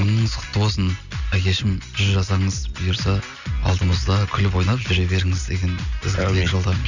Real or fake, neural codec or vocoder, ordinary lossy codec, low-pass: real; none; none; 7.2 kHz